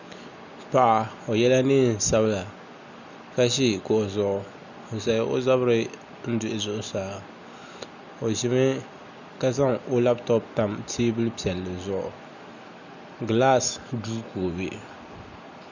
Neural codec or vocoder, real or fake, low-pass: none; real; 7.2 kHz